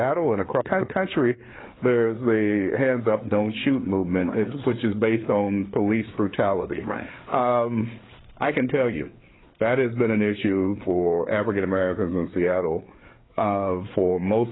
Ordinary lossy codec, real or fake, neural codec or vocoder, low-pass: AAC, 16 kbps; fake; codec, 16 kHz, 4 kbps, FreqCodec, larger model; 7.2 kHz